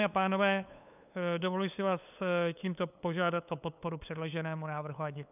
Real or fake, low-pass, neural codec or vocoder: fake; 3.6 kHz; codec, 16 kHz, 8 kbps, FunCodec, trained on LibriTTS, 25 frames a second